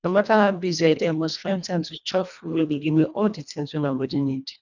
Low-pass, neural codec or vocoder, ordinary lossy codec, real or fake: 7.2 kHz; codec, 24 kHz, 1.5 kbps, HILCodec; none; fake